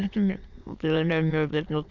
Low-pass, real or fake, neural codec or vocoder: 7.2 kHz; fake; autoencoder, 22.05 kHz, a latent of 192 numbers a frame, VITS, trained on many speakers